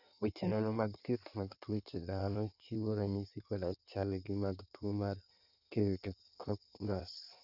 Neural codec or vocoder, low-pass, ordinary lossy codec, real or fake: codec, 16 kHz in and 24 kHz out, 1.1 kbps, FireRedTTS-2 codec; 5.4 kHz; none; fake